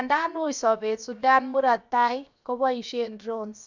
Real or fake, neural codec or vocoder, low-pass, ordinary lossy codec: fake; codec, 16 kHz, 0.7 kbps, FocalCodec; 7.2 kHz; none